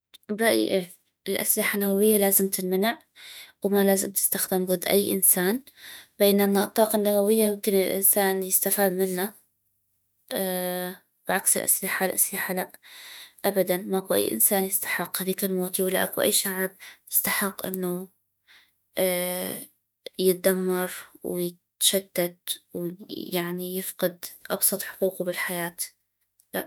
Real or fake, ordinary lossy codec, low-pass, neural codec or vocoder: fake; none; none; autoencoder, 48 kHz, 32 numbers a frame, DAC-VAE, trained on Japanese speech